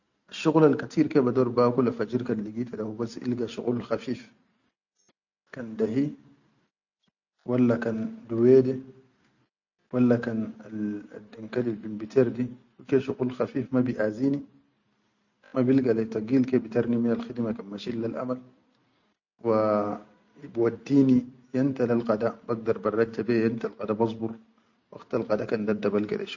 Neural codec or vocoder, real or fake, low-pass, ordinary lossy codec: none; real; 7.2 kHz; none